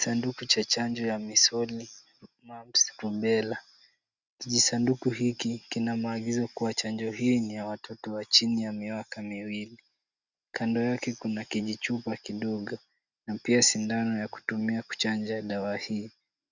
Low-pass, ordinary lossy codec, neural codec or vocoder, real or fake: 7.2 kHz; Opus, 64 kbps; none; real